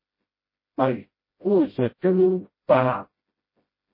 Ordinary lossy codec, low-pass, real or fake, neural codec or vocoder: MP3, 24 kbps; 5.4 kHz; fake; codec, 16 kHz, 0.5 kbps, FreqCodec, smaller model